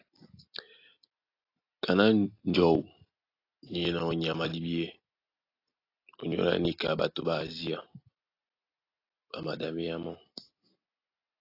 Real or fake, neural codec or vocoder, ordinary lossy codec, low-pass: real; none; AAC, 32 kbps; 5.4 kHz